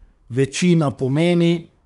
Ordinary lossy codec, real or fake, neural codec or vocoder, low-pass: none; fake; codec, 24 kHz, 1 kbps, SNAC; 10.8 kHz